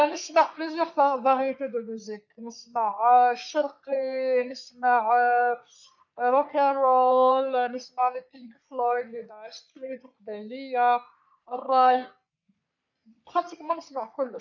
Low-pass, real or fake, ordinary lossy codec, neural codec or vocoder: 7.2 kHz; fake; none; codec, 44.1 kHz, 3.4 kbps, Pupu-Codec